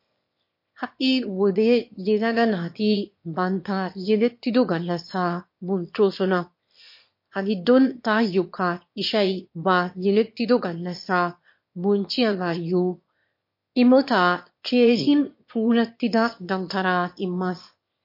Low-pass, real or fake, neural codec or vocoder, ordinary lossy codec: 5.4 kHz; fake; autoencoder, 22.05 kHz, a latent of 192 numbers a frame, VITS, trained on one speaker; MP3, 32 kbps